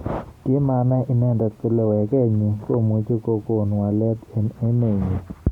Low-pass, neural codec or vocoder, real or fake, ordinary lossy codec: 19.8 kHz; none; real; Opus, 64 kbps